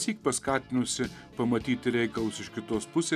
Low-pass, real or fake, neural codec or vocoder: 14.4 kHz; real; none